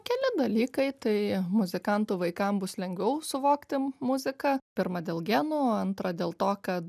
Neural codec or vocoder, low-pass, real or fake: none; 14.4 kHz; real